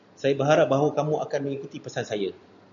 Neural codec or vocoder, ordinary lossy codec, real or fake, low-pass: none; MP3, 96 kbps; real; 7.2 kHz